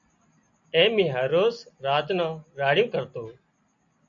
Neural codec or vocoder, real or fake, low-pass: none; real; 7.2 kHz